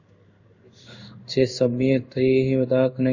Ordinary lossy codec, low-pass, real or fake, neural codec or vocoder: AAC, 48 kbps; 7.2 kHz; fake; codec, 16 kHz in and 24 kHz out, 1 kbps, XY-Tokenizer